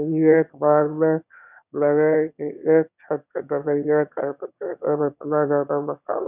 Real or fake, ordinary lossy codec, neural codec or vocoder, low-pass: fake; none; autoencoder, 22.05 kHz, a latent of 192 numbers a frame, VITS, trained on one speaker; 3.6 kHz